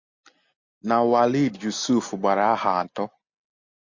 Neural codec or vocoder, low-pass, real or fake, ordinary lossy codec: none; 7.2 kHz; real; MP3, 48 kbps